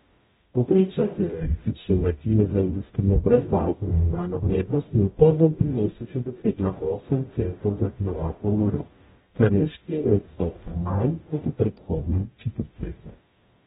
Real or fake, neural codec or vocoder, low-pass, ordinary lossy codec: fake; codec, 44.1 kHz, 0.9 kbps, DAC; 19.8 kHz; AAC, 16 kbps